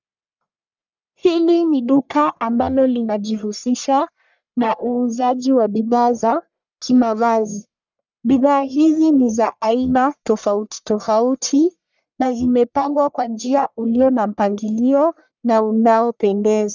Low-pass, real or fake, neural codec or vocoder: 7.2 kHz; fake; codec, 44.1 kHz, 1.7 kbps, Pupu-Codec